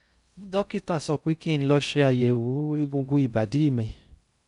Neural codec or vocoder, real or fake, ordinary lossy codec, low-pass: codec, 16 kHz in and 24 kHz out, 0.6 kbps, FocalCodec, streaming, 4096 codes; fake; none; 10.8 kHz